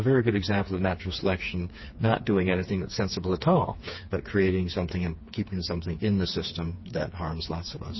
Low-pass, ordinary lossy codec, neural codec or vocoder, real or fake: 7.2 kHz; MP3, 24 kbps; codec, 16 kHz, 4 kbps, FreqCodec, smaller model; fake